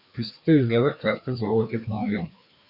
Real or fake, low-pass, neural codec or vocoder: fake; 5.4 kHz; codec, 16 kHz, 2 kbps, FreqCodec, larger model